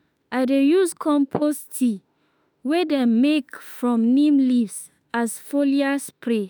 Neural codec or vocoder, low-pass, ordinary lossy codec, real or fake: autoencoder, 48 kHz, 32 numbers a frame, DAC-VAE, trained on Japanese speech; none; none; fake